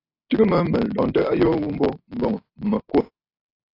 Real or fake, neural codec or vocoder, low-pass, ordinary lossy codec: real; none; 5.4 kHz; AAC, 32 kbps